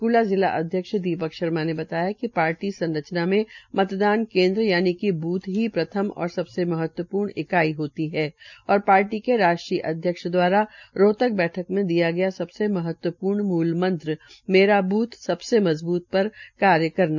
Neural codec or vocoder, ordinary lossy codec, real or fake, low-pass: none; none; real; 7.2 kHz